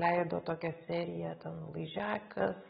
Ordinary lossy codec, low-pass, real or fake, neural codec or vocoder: AAC, 16 kbps; 19.8 kHz; real; none